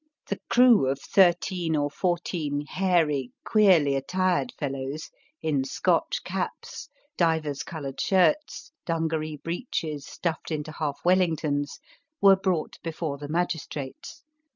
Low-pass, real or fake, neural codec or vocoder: 7.2 kHz; real; none